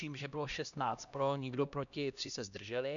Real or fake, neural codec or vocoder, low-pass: fake; codec, 16 kHz, 1 kbps, X-Codec, HuBERT features, trained on LibriSpeech; 7.2 kHz